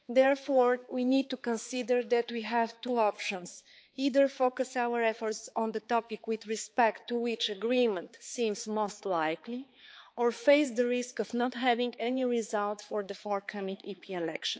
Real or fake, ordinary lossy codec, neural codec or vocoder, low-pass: fake; none; codec, 16 kHz, 4 kbps, X-Codec, HuBERT features, trained on balanced general audio; none